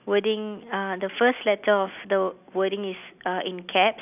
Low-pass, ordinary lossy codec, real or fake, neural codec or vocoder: 3.6 kHz; none; real; none